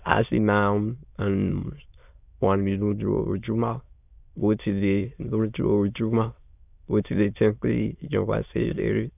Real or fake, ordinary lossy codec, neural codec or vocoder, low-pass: fake; none; autoencoder, 22.05 kHz, a latent of 192 numbers a frame, VITS, trained on many speakers; 3.6 kHz